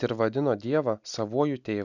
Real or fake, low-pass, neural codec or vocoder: real; 7.2 kHz; none